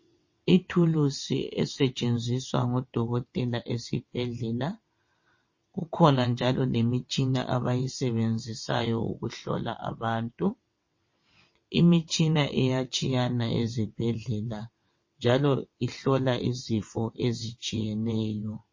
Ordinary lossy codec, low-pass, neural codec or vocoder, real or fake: MP3, 32 kbps; 7.2 kHz; vocoder, 22.05 kHz, 80 mel bands, WaveNeXt; fake